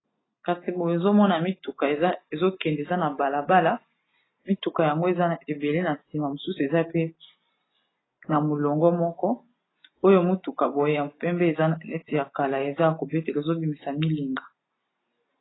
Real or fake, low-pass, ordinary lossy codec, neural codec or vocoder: real; 7.2 kHz; AAC, 16 kbps; none